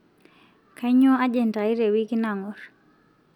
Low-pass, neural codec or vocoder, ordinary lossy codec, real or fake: 19.8 kHz; none; none; real